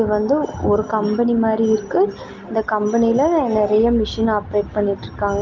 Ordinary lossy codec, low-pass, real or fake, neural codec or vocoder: Opus, 24 kbps; 7.2 kHz; real; none